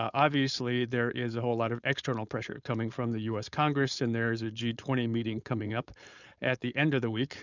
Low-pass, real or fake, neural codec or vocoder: 7.2 kHz; fake; codec, 16 kHz, 4.8 kbps, FACodec